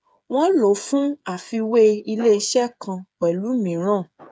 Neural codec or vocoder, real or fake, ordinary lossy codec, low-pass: codec, 16 kHz, 8 kbps, FreqCodec, smaller model; fake; none; none